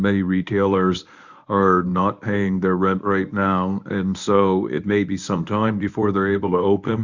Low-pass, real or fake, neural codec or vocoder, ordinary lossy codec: 7.2 kHz; fake; codec, 24 kHz, 0.9 kbps, WavTokenizer, medium speech release version 1; Opus, 64 kbps